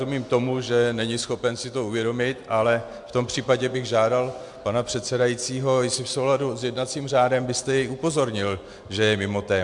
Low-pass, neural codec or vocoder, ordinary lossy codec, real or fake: 10.8 kHz; none; MP3, 64 kbps; real